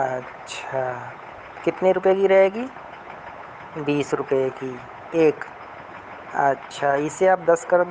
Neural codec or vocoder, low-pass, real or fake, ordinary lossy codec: codec, 16 kHz, 8 kbps, FunCodec, trained on Chinese and English, 25 frames a second; none; fake; none